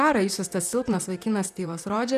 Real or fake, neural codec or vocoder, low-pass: fake; vocoder, 44.1 kHz, 128 mel bands, Pupu-Vocoder; 14.4 kHz